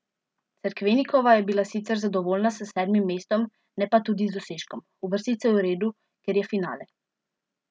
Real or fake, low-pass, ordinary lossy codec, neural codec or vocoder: real; none; none; none